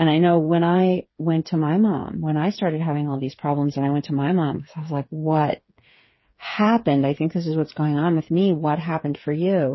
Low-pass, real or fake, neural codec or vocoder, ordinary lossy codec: 7.2 kHz; fake; codec, 16 kHz, 8 kbps, FreqCodec, smaller model; MP3, 24 kbps